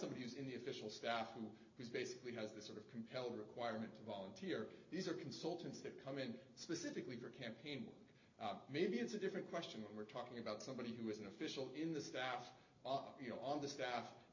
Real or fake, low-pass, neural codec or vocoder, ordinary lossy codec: real; 7.2 kHz; none; AAC, 48 kbps